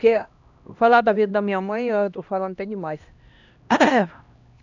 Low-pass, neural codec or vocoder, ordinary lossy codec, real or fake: 7.2 kHz; codec, 16 kHz, 1 kbps, X-Codec, HuBERT features, trained on LibriSpeech; none; fake